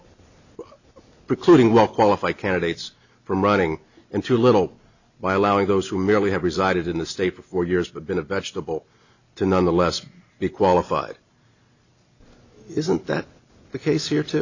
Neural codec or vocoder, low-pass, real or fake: none; 7.2 kHz; real